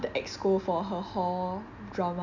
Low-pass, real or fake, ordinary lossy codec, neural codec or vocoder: 7.2 kHz; real; none; none